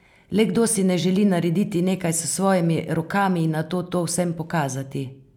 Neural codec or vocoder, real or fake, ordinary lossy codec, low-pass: none; real; none; 19.8 kHz